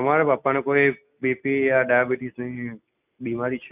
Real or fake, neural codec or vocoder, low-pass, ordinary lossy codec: real; none; 3.6 kHz; none